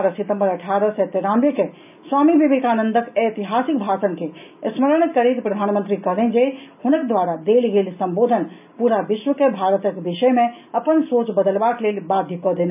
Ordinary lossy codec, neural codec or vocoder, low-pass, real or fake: none; none; 3.6 kHz; real